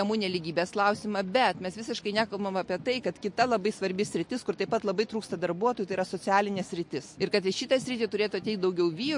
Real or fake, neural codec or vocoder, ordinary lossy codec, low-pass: real; none; MP3, 48 kbps; 10.8 kHz